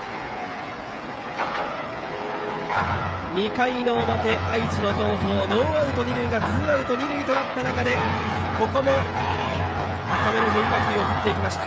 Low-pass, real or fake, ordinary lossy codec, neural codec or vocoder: none; fake; none; codec, 16 kHz, 8 kbps, FreqCodec, smaller model